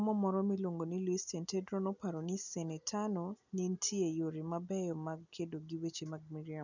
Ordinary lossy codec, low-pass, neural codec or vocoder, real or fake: none; 7.2 kHz; none; real